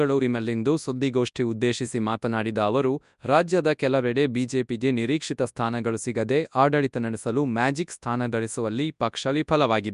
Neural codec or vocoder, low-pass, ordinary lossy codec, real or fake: codec, 24 kHz, 0.9 kbps, WavTokenizer, large speech release; 10.8 kHz; none; fake